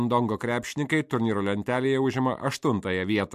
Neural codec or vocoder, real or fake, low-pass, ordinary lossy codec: none; real; 14.4 kHz; MP3, 64 kbps